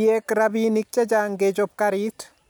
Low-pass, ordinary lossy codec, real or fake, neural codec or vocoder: none; none; real; none